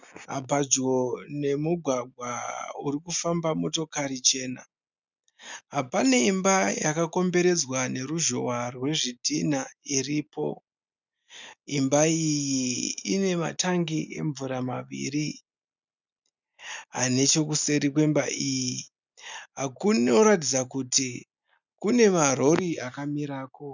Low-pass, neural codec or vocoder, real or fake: 7.2 kHz; none; real